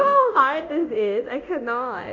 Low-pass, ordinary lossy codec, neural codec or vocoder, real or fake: 7.2 kHz; MP3, 64 kbps; codec, 24 kHz, 0.9 kbps, DualCodec; fake